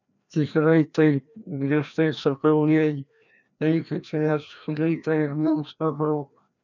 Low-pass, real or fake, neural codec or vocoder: 7.2 kHz; fake; codec, 16 kHz, 1 kbps, FreqCodec, larger model